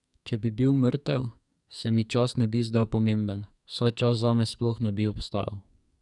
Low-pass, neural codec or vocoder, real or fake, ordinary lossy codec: 10.8 kHz; codec, 44.1 kHz, 2.6 kbps, SNAC; fake; none